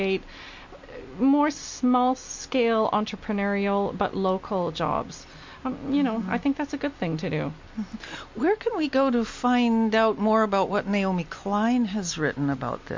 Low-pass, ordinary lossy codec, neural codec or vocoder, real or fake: 7.2 kHz; MP3, 48 kbps; none; real